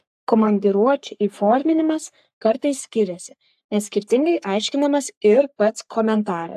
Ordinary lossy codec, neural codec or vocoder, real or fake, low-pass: AAC, 96 kbps; codec, 44.1 kHz, 3.4 kbps, Pupu-Codec; fake; 14.4 kHz